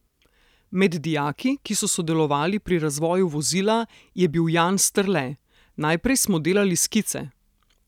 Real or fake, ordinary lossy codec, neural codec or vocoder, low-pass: real; none; none; 19.8 kHz